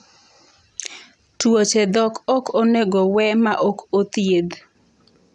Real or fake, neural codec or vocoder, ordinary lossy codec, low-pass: real; none; none; 10.8 kHz